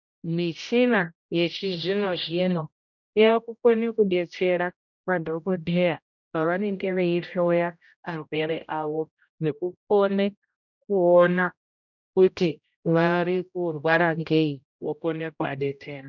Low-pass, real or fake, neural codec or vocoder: 7.2 kHz; fake; codec, 16 kHz, 0.5 kbps, X-Codec, HuBERT features, trained on general audio